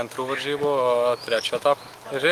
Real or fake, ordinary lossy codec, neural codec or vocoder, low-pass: fake; Opus, 24 kbps; autoencoder, 48 kHz, 128 numbers a frame, DAC-VAE, trained on Japanese speech; 14.4 kHz